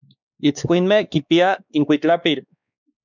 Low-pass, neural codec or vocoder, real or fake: 7.2 kHz; codec, 16 kHz, 2 kbps, X-Codec, WavLM features, trained on Multilingual LibriSpeech; fake